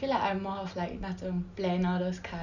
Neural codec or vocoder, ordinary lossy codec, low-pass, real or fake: none; none; 7.2 kHz; real